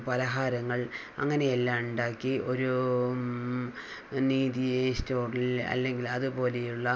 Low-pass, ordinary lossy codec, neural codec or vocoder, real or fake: none; none; none; real